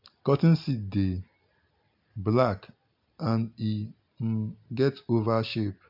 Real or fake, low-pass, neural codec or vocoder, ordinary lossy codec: real; 5.4 kHz; none; MP3, 48 kbps